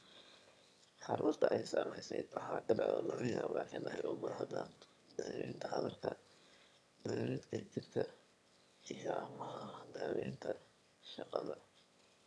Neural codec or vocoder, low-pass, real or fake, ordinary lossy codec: autoencoder, 22.05 kHz, a latent of 192 numbers a frame, VITS, trained on one speaker; none; fake; none